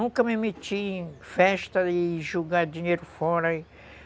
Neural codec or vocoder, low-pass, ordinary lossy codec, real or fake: none; none; none; real